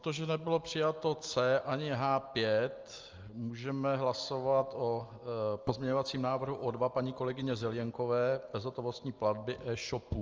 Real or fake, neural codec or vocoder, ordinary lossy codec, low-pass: real; none; Opus, 24 kbps; 7.2 kHz